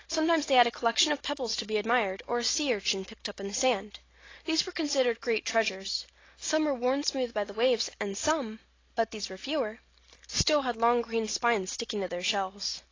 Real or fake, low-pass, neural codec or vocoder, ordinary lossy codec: real; 7.2 kHz; none; AAC, 32 kbps